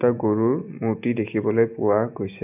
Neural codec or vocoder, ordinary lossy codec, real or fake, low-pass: none; none; real; 3.6 kHz